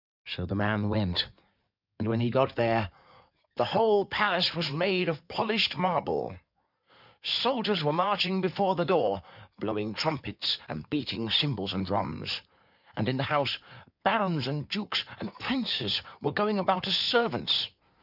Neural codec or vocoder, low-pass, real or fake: codec, 16 kHz in and 24 kHz out, 2.2 kbps, FireRedTTS-2 codec; 5.4 kHz; fake